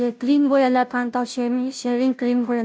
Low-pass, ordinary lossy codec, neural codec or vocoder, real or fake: none; none; codec, 16 kHz, 0.5 kbps, FunCodec, trained on Chinese and English, 25 frames a second; fake